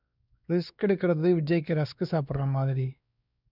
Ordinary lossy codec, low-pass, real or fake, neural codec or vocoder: none; 5.4 kHz; fake; codec, 16 kHz, 2 kbps, X-Codec, WavLM features, trained on Multilingual LibriSpeech